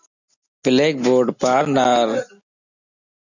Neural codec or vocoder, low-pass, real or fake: none; 7.2 kHz; real